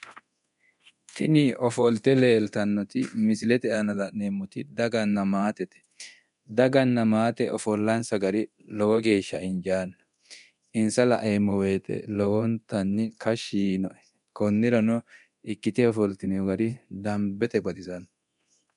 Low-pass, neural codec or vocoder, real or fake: 10.8 kHz; codec, 24 kHz, 0.9 kbps, DualCodec; fake